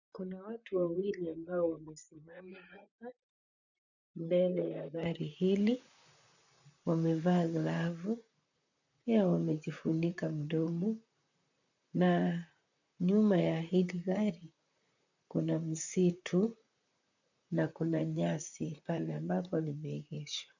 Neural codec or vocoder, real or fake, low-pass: vocoder, 44.1 kHz, 128 mel bands, Pupu-Vocoder; fake; 7.2 kHz